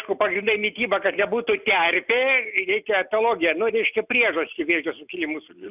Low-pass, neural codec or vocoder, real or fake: 3.6 kHz; none; real